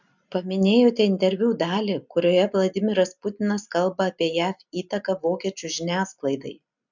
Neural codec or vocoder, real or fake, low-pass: none; real; 7.2 kHz